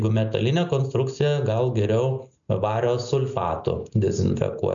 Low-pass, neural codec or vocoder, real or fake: 7.2 kHz; none; real